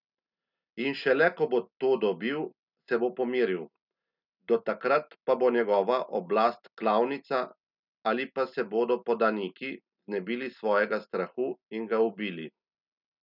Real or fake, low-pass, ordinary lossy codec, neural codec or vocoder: real; 5.4 kHz; none; none